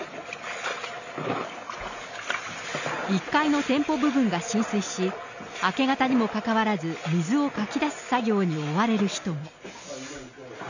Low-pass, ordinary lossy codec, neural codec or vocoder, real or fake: 7.2 kHz; none; none; real